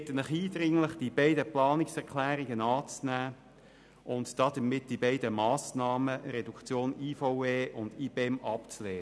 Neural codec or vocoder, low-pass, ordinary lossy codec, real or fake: none; none; none; real